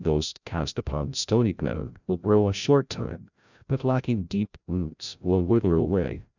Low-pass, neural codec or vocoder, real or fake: 7.2 kHz; codec, 16 kHz, 0.5 kbps, FreqCodec, larger model; fake